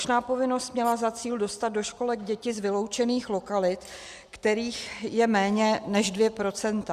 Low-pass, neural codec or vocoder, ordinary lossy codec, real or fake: 14.4 kHz; none; Opus, 64 kbps; real